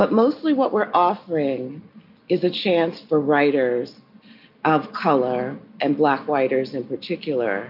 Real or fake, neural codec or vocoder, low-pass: real; none; 5.4 kHz